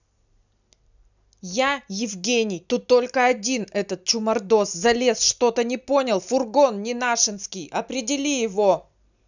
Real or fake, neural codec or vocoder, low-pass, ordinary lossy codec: real; none; 7.2 kHz; none